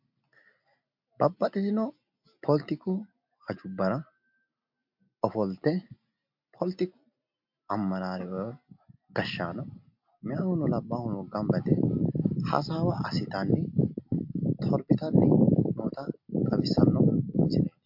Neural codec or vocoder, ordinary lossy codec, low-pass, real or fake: none; MP3, 48 kbps; 5.4 kHz; real